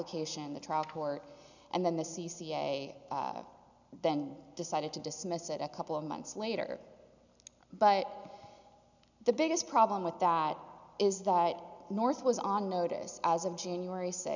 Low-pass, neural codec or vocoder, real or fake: 7.2 kHz; none; real